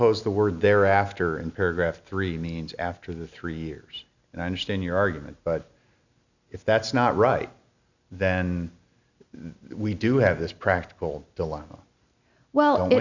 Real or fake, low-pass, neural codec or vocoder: real; 7.2 kHz; none